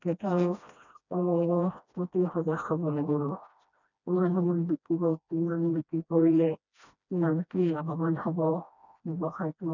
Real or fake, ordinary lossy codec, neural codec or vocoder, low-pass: fake; none; codec, 16 kHz, 1 kbps, FreqCodec, smaller model; 7.2 kHz